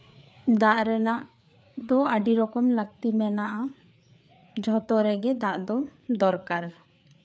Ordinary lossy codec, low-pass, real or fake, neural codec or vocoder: none; none; fake; codec, 16 kHz, 4 kbps, FreqCodec, larger model